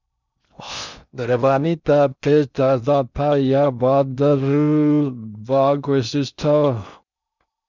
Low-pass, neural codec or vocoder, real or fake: 7.2 kHz; codec, 16 kHz in and 24 kHz out, 0.6 kbps, FocalCodec, streaming, 2048 codes; fake